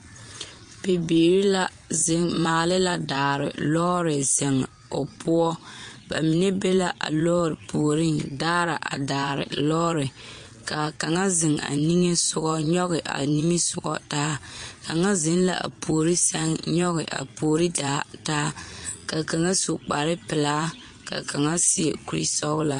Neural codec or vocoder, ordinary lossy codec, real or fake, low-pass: vocoder, 22.05 kHz, 80 mel bands, Vocos; MP3, 48 kbps; fake; 9.9 kHz